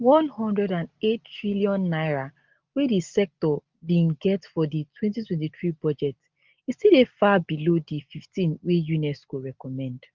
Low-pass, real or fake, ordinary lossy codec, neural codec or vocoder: 7.2 kHz; real; Opus, 32 kbps; none